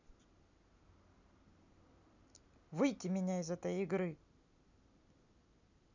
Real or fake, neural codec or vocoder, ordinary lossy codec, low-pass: real; none; none; 7.2 kHz